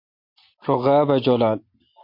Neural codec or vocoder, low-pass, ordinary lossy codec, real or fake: none; 5.4 kHz; MP3, 32 kbps; real